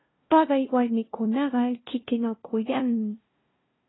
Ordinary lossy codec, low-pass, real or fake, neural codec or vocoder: AAC, 16 kbps; 7.2 kHz; fake; codec, 16 kHz, 0.5 kbps, FunCodec, trained on LibriTTS, 25 frames a second